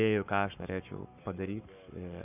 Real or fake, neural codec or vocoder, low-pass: fake; codec, 44.1 kHz, 7.8 kbps, Pupu-Codec; 3.6 kHz